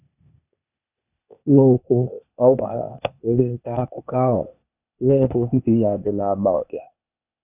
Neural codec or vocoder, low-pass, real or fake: codec, 16 kHz, 0.8 kbps, ZipCodec; 3.6 kHz; fake